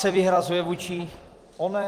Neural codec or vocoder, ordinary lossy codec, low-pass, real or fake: vocoder, 44.1 kHz, 128 mel bands every 256 samples, BigVGAN v2; Opus, 32 kbps; 14.4 kHz; fake